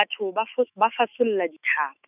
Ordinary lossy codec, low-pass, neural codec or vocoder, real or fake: none; 3.6 kHz; autoencoder, 48 kHz, 128 numbers a frame, DAC-VAE, trained on Japanese speech; fake